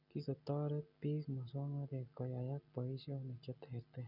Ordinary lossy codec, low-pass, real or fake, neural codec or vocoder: none; 5.4 kHz; real; none